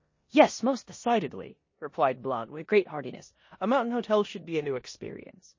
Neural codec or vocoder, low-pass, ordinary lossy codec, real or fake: codec, 16 kHz in and 24 kHz out, 0.9 kbps, LongCat-Audio-Codec, four codebook decoder; 7.2 kHz; MP3, 32 kbps; fake